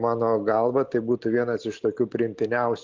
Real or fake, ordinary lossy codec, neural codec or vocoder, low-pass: real; Opus, 32 kbps; none; 7.2 kHz